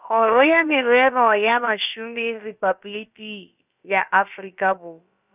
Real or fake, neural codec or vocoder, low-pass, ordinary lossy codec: fake; codec, 16 kHz, about 1 kbps, DyCAST, with the encoder's durations; 3.6 kHz; none